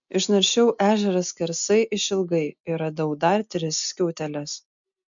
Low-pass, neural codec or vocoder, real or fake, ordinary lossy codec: 7.2 kHz; none; real; MP3, 64 kbps